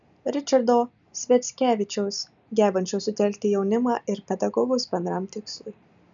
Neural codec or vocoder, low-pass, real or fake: none; 7.2 kHz; real